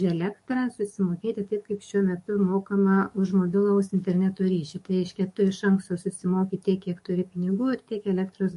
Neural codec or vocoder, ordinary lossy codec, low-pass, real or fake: codec, 44.1 kHz, 7.8 kbps, DAC; MP3, 48 kbps; 14.4 kHz; fake